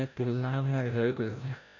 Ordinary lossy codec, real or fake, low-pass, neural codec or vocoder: none; fake; 7.2 kHz; codec, 16 kHz, 0.5 kbps, FreqCodec, larger model